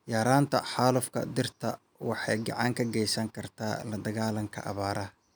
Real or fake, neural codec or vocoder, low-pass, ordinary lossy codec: real; none; none; none